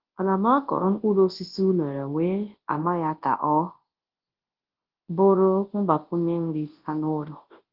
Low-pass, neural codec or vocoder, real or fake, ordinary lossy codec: 5.4 kHz; codec, 24 kHz, 0.9 kbps, WavTokenizer, large speech release; fake; Opus, 16 kbps